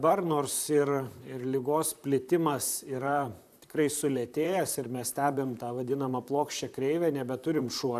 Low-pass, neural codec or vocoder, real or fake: 14.4 kHz; vocoder, 44.1 kHz, 128 mel bands, Pupu-Vocoder; fake